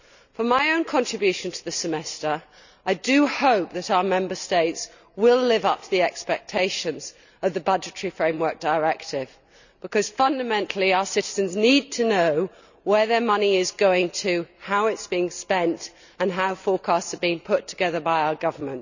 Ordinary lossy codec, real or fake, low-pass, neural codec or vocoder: none; real; 7.2 kHz; none